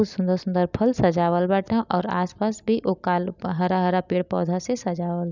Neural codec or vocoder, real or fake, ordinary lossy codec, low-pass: none; real; none; 7.2 kHz